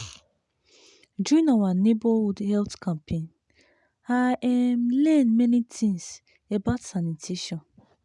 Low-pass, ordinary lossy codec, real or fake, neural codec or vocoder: 10.8 kHz; none; real; none